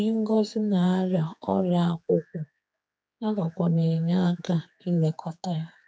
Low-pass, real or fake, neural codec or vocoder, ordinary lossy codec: none; fake; codec, 16 kHz, 4 kbps, X-Codec, HuBERT features, trained on general audio; none